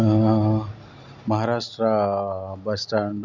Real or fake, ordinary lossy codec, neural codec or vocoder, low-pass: real; none; none; 7.2 kHz